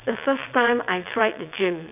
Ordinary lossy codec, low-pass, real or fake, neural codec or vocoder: none; 3.6 kHz; fake; vocoder, 22.05 kHz, 80 mel bands, WaveNeXt